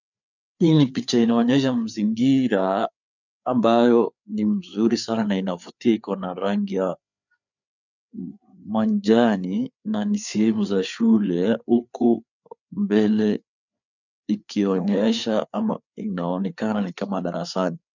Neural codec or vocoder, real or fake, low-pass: codec, 16 kHz, 4 kbps, FreqCodec, larger model; fake; 7.2 kHz